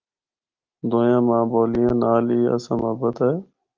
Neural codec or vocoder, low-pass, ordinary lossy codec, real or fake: none; 7.2 kHz; Opus, 32 kbps; real